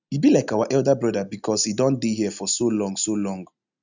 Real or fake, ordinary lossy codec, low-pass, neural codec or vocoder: real; none; 7.2 kHz; none